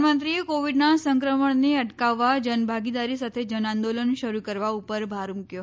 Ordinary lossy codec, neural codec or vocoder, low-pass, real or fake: none; none; none; real